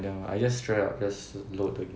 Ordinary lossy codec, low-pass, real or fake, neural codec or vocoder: none; none; real; none